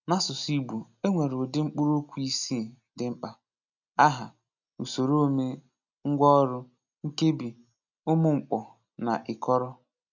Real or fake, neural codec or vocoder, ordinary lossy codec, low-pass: real; none; none; 7.2 kHz